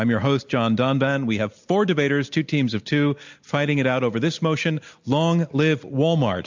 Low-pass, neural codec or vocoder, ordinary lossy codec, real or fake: 7.2 kHz; none; MP3, 64 kbps; real